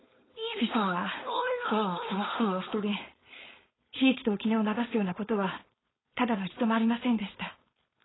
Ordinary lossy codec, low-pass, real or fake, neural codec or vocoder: AAC, 16 kbps; 7.2 kHz; fake; codec, 16 kHz, 4.8 kbps, FACodec